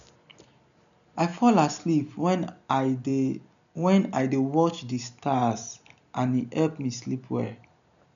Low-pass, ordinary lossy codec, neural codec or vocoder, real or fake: 7.2 kHz; none; none; real